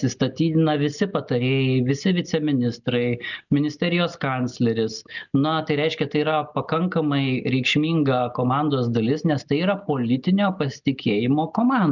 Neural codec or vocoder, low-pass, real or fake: none; 7.2 kHz; real